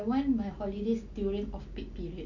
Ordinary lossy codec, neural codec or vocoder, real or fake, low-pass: none; none; real; 7.2 kHz